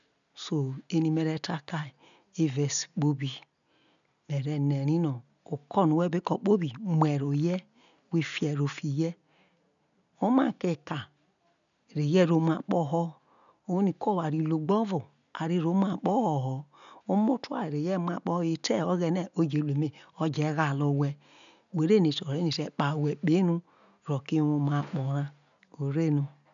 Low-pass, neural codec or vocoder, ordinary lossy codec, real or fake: 7.2 kHz; none; none; real